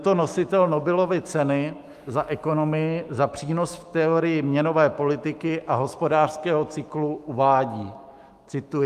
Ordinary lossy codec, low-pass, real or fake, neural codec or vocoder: Opus, 32 kbps; 14.4 kHz; fake; autoencoder, 48 kHz, 128 numbers a frame, DAC-VAE, trained on Japanese speech